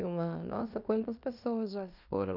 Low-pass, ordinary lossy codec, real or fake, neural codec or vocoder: 5.4 kHz; none; fake; codec, 16 kHz in and 24 kHz out, 0.9 kbps, LongCat-Audio-Codec, fine tuned four codebook decoder